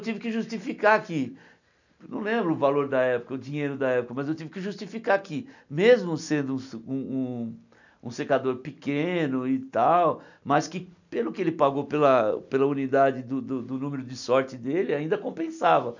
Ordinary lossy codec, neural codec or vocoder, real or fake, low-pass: none; none; real; 7.2 kHz